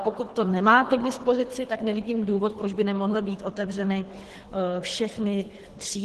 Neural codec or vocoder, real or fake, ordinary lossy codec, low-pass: codec, 24 kHz, 3 kbps, HILCodec; fake; Opus, 16 kbps; 10.8 kHz